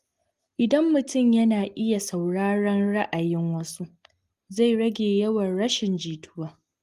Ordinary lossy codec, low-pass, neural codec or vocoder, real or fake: Opus, 24 kbps; 14.4 kHz; none; real